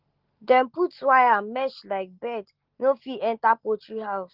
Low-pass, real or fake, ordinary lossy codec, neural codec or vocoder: 5.4 kHz; real; Opus, 16 kbps; none